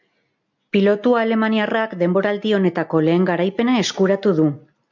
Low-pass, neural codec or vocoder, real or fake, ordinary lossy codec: 7.2 kHz; none; real; MP3, 64 kbps